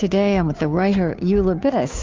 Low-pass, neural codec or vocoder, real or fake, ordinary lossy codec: 7.2 kHz; codec, 16 kHz, 2 kbps, FunCodec, trained on Chinese and English, 25 frames a second; fake; Opus, 32 kbps